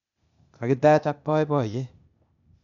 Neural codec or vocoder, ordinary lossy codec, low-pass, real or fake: codec, 16 kHz, 0.8 kbps, ZipCodec; none; 7.2 kHz; fake